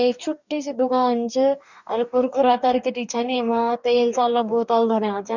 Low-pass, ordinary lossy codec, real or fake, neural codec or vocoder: 7.2 kHz; none; fake; codec, 44.1 kHz, 2.6 kbps, DAC